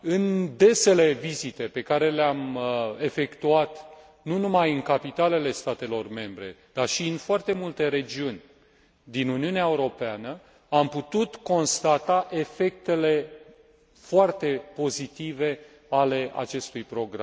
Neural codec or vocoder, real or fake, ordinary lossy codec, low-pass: none; real; none; none